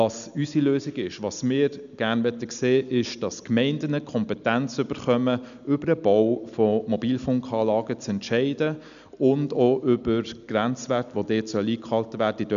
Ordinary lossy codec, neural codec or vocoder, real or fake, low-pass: none; none; real; 7.2 kHz